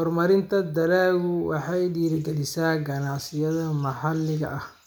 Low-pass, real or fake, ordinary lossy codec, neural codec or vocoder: none; real; none; none